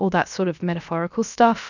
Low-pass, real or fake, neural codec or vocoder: 7.2 kHz; fake; codec, 16 kHz, 0.7 kbps, FocalCodec